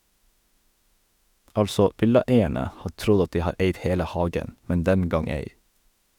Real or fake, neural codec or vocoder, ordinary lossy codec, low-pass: fake; autoencoder, 48 kHz, 32 numbers a frame, DAC-VAE, trained on Japanese speech; none; 19.8 kHz